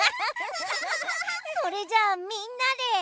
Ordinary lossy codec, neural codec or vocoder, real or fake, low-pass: none; none; real; none